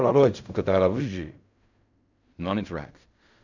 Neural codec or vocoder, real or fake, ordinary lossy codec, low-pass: codec, 16 kHz in and 24 kHz out, 0.4 kbps, LongCat-Audio-Codec, fine tuned four codebook decoder; fake; none; 7.2 kHz